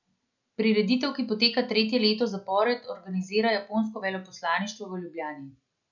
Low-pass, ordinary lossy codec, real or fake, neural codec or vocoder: 7.2 kHz; none; real; none